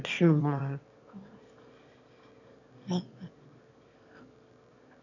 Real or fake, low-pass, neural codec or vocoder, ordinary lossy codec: fake; 7.2 kHz; autoencoder, 22.05 kHz, a latent of 192 numbers a frame, VITS, trained on one speaker; none